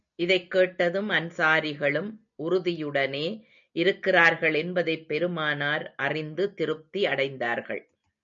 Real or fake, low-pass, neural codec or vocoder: real; 7.2 kHz; none